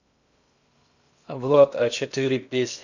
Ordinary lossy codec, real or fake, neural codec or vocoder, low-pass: none; fake; codec, 16 kHz in and 24 kHz out, 0.6 kbps, FocalCodec, streaming, 2048 codes; 7.2 kHz